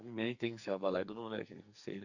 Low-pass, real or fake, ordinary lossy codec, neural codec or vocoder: 7.2 kHz; fake; none; codec, 44.1 kHz, 2.6 kbps, SNAC